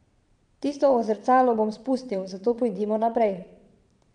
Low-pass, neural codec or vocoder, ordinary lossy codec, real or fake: 9.9 kHz; vocoder, 22.05 kHz, 80 mel bands, Vocos; none; fake